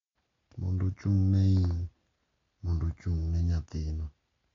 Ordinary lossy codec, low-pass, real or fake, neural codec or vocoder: AAC, 32 kbps; 7.2 kHz; real; none